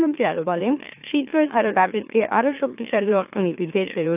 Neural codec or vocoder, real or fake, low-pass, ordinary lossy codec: autoencoder, 44.1 kHz, a latent of 192 numbers a frame, MeloTTS; fake; 3.6 kHz; AAC, 32 kbps